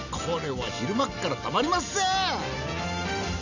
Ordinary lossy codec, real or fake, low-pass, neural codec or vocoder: none; real; 7.2 kHz; none